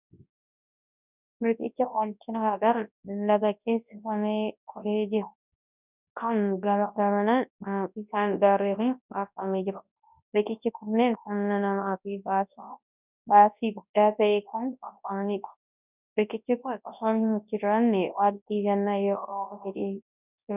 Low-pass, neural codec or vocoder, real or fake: 3.6 kHz; codec, 24 kHz, 0.9 kbps, WavTokenizer, large speech release; fake